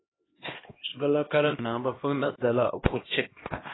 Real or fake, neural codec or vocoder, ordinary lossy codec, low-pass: fake; codec, 16 kHz, 1 kbps, X-Codec, HuBERT features, trained on LibriSpeech; AAC, 16 kbps; 7.2 kHz